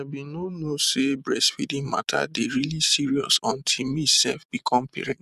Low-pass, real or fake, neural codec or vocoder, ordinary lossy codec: 14.4 kHz; fake; vocoder, 44.1 kHz, 128 mel bands every 512 samples, BigVGAN v2; none